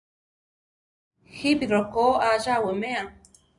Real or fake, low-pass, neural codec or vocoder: real; 10.8 kHz; none